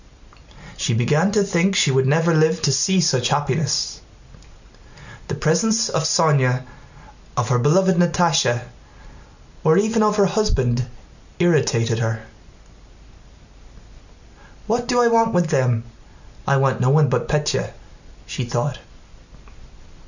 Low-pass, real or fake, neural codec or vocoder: 7.2 kHz; real; none